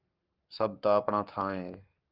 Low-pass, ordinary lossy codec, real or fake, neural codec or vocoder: 5.4 kHz; Opus, 24 kbps; fake; codec, 44.1 kHz, 7.8 kbps, Pupu-Codec